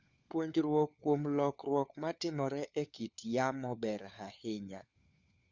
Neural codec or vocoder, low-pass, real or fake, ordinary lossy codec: codec, 16 kHz, 4 kbps, FunCodec, trained on LibriTTS, 50 frames a second; 7.2 kHz; fake; Opus, 64 kbps